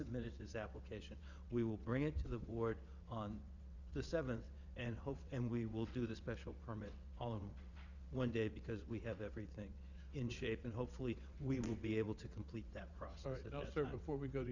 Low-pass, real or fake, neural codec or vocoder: 7.2 kHz; fake; vocoder, 22.05 kHz, 80 mel bands, WaveNeXt